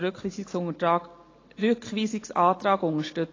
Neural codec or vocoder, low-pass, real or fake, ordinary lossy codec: none; 7.2 kHz; real; AAC, 32 kbps